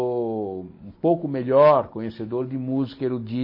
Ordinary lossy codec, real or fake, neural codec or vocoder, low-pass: MP3, 24 kbps; real; none; 5.4 kHz